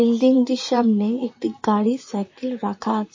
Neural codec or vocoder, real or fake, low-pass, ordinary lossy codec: vocoder, 44.1 kHz, 128 mel bands, Pupu-Vocoder; fake; 7.2 kHz; MP3, 32 kbps